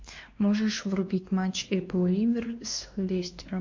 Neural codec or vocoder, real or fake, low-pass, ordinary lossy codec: codec, 16 kHz, 2 kbps, X-Codec, WavLM features, trained on Multilingual LibriSpeech; fake; 7.2 kHz; MP3, 48 kbps